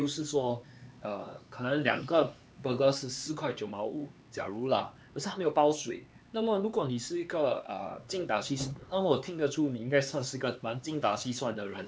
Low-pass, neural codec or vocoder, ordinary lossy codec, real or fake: none; codec, 16 kHz, 4 kbps, X-Codec, HuBERT features, trained on LibriSpeech; none; fake